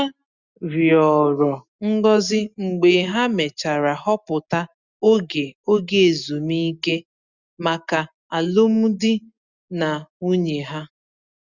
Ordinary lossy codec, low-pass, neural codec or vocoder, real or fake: Opus, 64 kbps; 7.2 kHz; none; real